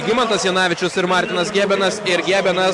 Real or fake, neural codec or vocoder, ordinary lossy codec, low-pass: real; none; Opus, 64 kbps; 10.8 kHz